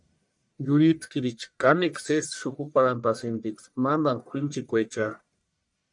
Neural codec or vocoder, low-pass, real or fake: codec, 44.1 kHz, 1.7 kbps, Pupu-Codec; 10.8 kHz; fake